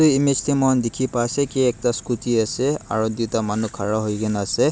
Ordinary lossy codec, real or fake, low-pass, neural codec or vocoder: none; real; none; none